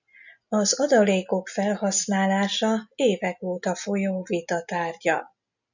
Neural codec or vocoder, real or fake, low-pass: none; real; 7.2 kHz